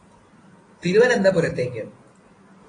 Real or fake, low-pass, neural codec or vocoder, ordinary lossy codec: real; 9.9 kHz; none; AAC, 32 kbps